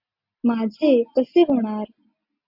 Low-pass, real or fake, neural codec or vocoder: 5.4 kHz; real; none